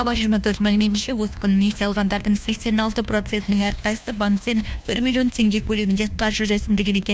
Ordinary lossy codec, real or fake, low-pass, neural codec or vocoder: none; fake; none; codec, 16 kHz, 1 kbps, FunCodec, trained on LibriTTS, 50 frames a second